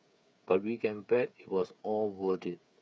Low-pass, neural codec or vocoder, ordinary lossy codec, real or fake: none; codec, 16 kHz, 8 kbps, FreqCodec, smaller model; none; fake